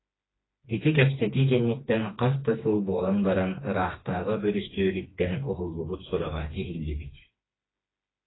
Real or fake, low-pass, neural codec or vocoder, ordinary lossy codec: fake; 7.2 kHz; codec, 16 kHz, 2 kbps, FreqCodec, smaller model; AAC, 16 kbps